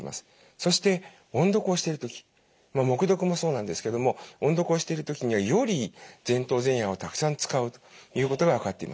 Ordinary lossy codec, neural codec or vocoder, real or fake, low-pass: none; none; real; none